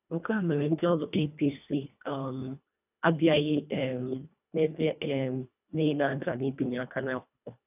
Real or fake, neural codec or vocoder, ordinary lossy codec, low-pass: fake; codec, 24 kHz, 1.5 kbps, HILCodec; none; 3.6 kHz